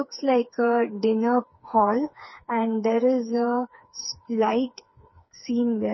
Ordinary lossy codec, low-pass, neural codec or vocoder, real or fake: MP3, 24 kbps; 7.2 kHz; codec, 16 kHz, 4 kbps, FreqCodec, smaller model; fake